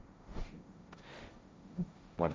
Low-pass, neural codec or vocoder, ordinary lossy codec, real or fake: none; codec, 16 kHz, 1.1 kbps, Voila-Tokenizer; none; fake